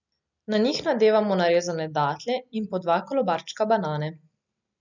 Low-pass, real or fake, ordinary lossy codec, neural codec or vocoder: 7.2 kHz; real; none; none